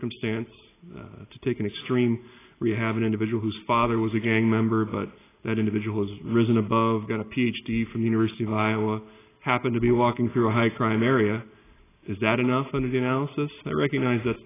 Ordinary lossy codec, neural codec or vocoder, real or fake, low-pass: AAC, 16 kbps; none; real; 3.6 kHz